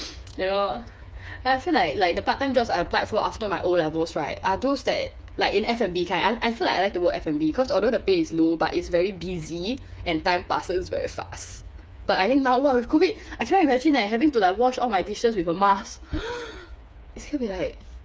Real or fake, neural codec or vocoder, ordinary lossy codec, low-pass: fake; codec, 16 kHz, 4 kbps, FreqCodec, smaller model; none; none